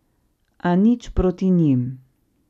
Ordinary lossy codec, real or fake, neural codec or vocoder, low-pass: none; real; none; 14.4 kHz